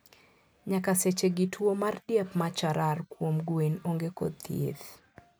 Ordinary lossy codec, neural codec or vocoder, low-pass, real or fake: none; none; none; real